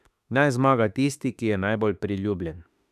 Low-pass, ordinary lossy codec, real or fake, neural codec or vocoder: 14.4 kHz; none; fake; autoencoder, 48 kHz, 32 numbers a frame, DAC-VAE, trained on Japanese speech